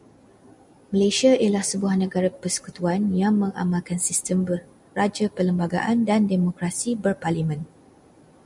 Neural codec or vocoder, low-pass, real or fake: none; 10.8 kHz; real